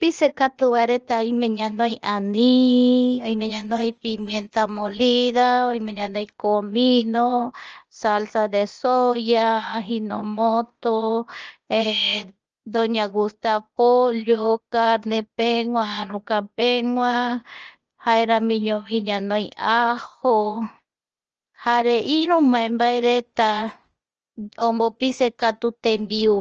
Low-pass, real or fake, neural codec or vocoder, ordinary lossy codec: 7.2 kHz; fake; codec, 16 kHz, 0.8 kbps, ZipCodec; Opus, 24 kbps